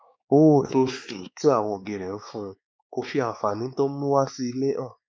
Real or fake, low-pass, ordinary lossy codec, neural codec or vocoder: fake; none; none; codec, 16 kHz, 4 kbps, X-Codec, WavLM features, trained on Multilingual LibriSpeech